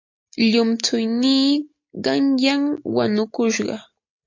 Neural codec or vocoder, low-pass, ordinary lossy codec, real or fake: none; 7.2 kHz; MP3, 48 kbps; real